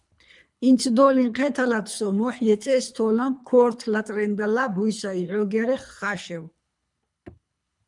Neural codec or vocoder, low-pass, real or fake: codec, 24 kHz, 3 kbps, HILCodec; 10.8 kHz; fake